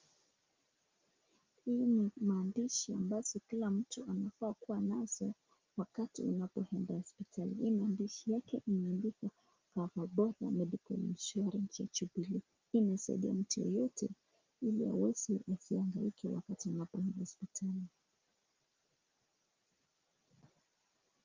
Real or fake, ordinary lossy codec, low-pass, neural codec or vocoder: real; Opus, 24 kbps; 7.2 kHz; none